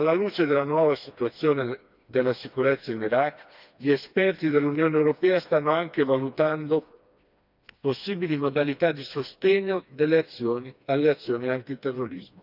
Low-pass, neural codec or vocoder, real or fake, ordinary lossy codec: 5.4 kHz; codec, 16 kHz, 2 kbps, FreqCodec, smaller model; fake; none